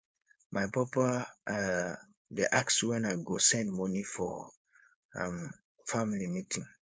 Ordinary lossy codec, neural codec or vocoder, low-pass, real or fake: none; codec, 16 kHz, 4.8 kbps, FACodec; none; fake